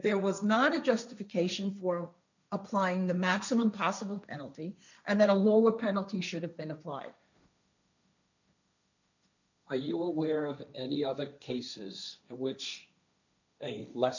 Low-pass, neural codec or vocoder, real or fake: 7.2 kHz; codec, 16 kHz, 1.1 kbps, Voila-Tokenizer; fake